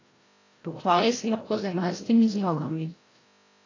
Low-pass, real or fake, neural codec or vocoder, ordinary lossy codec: 7.2 kHz; fake; codec, 16 kHz, 0.5 kbps, FreqCodec, larger model; AAC, 32 kbps